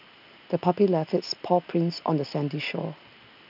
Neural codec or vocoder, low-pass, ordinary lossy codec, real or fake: none; 5.4 kHz; none; real